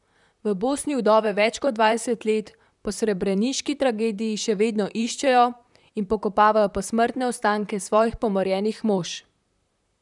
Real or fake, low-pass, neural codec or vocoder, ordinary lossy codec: fake; 10.8 kHz; vocoder, 44.1 kHz, 128 mel bands, Pupu-Vocoder; none